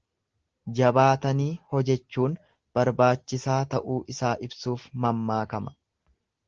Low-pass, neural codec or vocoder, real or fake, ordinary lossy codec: 7.2 kHz; none; real; Opus, 16 kbps